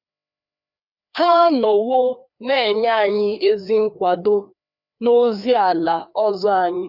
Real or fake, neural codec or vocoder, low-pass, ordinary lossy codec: fake; codec, 16 kHz, 2 kbps, FreqCodec, larger model; 5.4 kHz; none